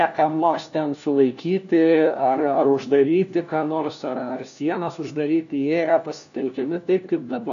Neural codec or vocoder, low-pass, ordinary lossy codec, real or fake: codec, 16 kHz, 1 kbps, FunCodec, trained on LibriTTS, 50 frames a second; 7.2 kHz; MP3, 96 kbps; fake